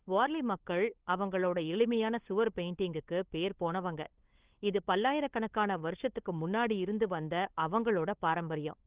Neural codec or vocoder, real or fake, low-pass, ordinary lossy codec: codec, 16 kHz, 8 kbps, FunCodec, trained on LibriTTS, 25 frames a second; fake; 3.6 kHz; Opus, 16 kbps